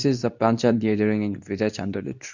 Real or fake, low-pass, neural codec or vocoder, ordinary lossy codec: fake; 7.2 kHz; codec, 24 kHz, 0.9 kbps, WavTokenizer, medium speech release version 2; none